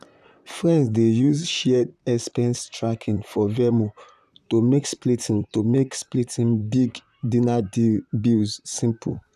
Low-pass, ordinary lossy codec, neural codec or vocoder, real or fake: 14.4 kHz; none; vocoder, 44.1 kHz, 128 mel bands, Pupu-Vocoder; fake